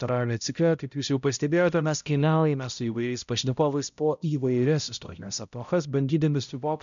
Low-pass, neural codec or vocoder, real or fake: 7.2 kHz; codec, 16 kHz, 0.5 kbps, X-Codec, HuBERT features, trained on balanced general audio; fake